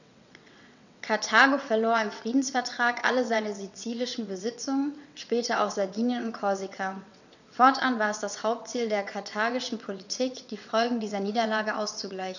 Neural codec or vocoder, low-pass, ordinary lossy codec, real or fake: vocoder, 22.05 kHz, 80 mel bands, WaveNeXt; 7.2 kHz; none; fake